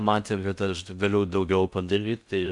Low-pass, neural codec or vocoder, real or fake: 10.8 kHz; codec, 16 kHz in and 24 kHz out, 0.6 kbps, FocalCodec, streaming, 4096 codes; fake